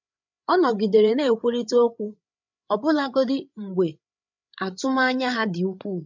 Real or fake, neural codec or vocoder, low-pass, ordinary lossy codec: fake; codec, 16 kHz, 8 kbps, FreqCodec, larger model; 7.2 kHz; MP3, 64 kbps